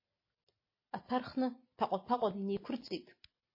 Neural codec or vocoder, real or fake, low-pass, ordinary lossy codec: none; real; 5.4 kHz; MP3, 24 kbps